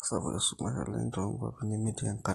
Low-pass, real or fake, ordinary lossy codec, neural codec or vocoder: 19.8 kHz; real; AAC, 32 kbps; none